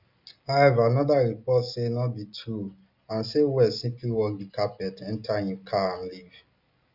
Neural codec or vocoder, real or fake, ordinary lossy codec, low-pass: none; real; none; 5.4 kHz